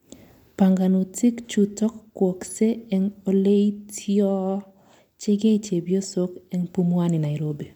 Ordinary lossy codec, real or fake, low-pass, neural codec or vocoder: MP3, 96 kbps; real; 19.8 kHz; none